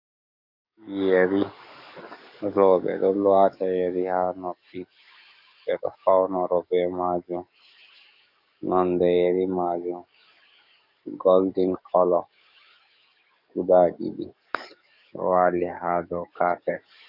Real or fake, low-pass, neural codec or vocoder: fake; 5.4 kHz; codec, 44.1 kHz, 7.8 kbps, DAC